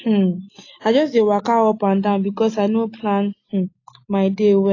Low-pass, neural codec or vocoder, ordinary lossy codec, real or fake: 7.2 kHz; none; AAC, 32 kbps; real